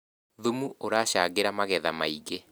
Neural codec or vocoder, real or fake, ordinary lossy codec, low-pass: none; real; none; none